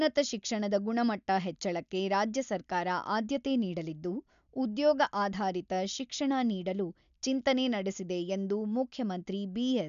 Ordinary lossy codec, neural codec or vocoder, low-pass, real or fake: MP3, 96 kbps; none; 7.2 kHz; real